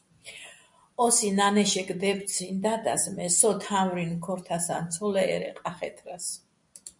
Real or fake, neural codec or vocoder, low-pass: real; none; 10.8 kHz